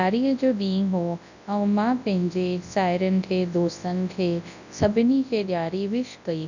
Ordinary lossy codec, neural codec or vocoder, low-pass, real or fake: none; codec, 24 kHz, 0.9 kbps, WavTokenizer, large speech release; 7.2 kHz; fake